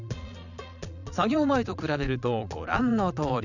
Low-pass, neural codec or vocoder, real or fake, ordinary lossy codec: 7.2 kHz; vocoder, 22.05 kHz, 80 mel bands, Vocos; fake; none